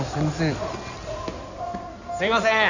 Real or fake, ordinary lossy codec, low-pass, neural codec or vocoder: fake; none; 7.2 kHz; codec, 16 kHz in and 24 kHz out, 2.2 kbps, FireRedTTS-2 codec